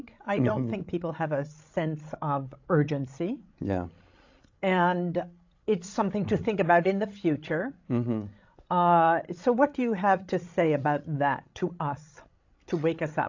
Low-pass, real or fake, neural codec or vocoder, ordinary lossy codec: 7.2 kHz; fake; codec, 16 kHz, 16 kbps, FreqCodec, larger model; AAC, 48 kbps